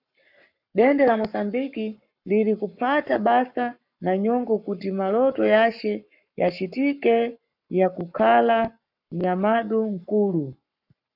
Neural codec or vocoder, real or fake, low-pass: codec, 44.1 kHz, 7.8 kbps, Pupu-Codec; fake; 5.4 kHz